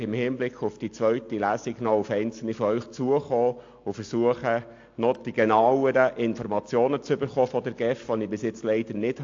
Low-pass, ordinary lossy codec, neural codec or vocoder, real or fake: 7.2 kHz; AAC, 48 kbps; none; real